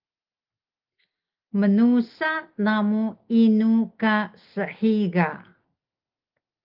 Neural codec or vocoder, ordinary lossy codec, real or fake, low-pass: none; Opus, 32 kbps; real; 5.4 kHz